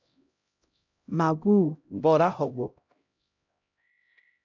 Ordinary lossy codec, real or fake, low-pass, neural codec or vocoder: AAC, 48 kbps; fake; 7.2 kHz; codec, 16 kHz, 0.5 kbps, X-Codec, HuBERT features, trained on LibriSpeech